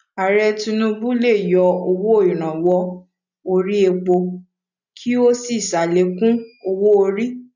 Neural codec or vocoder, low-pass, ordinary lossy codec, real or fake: none; 7.2 kHz; none; real